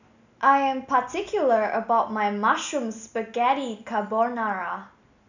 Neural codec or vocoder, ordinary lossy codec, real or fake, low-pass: none; none; real; 7.2 kHz